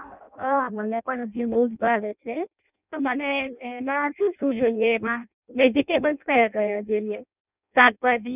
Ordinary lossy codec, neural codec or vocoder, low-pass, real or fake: none; codec, 16 kHz in and 24 kHz out, 0.6 kbps, FireRedTTS-2 codec; 3.6 kHz; fake